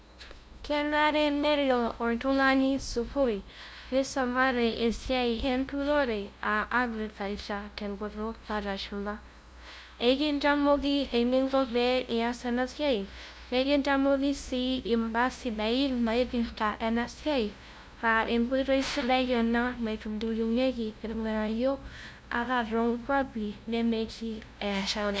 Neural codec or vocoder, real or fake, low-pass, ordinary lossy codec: codec, 16 kHz, 0.5 kbps, FunCodec, trained on LibriTTS, 25 frames a second; fake; none; none